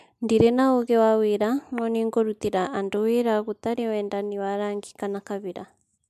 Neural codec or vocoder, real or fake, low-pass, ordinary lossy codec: none; real; 14.4 kHz; MP3, 96 kbps